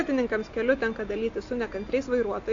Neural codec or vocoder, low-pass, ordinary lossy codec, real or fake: none; 7.2 kHz; AAC, 48 kbps; real